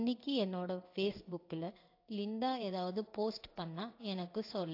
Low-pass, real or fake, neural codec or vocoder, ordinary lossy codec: 5.4 kHz; fake; codec, 16 kHz in and 24 kHz out, 1 kbps, XY-Tokenizer; AAC, 32 kbps